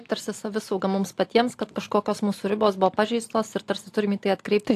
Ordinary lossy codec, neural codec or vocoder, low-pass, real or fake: AAC, 64 kbps; none; 14.4 kHz; real